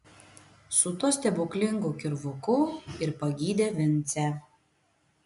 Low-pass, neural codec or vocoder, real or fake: 10.8 kHz; none; real